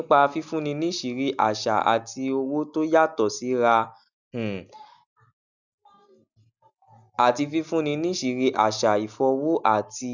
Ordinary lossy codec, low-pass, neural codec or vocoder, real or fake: none; 7.2 kHz; none; real